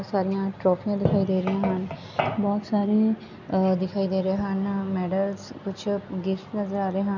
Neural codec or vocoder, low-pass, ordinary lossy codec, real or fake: none; 7.2 kHz; none; real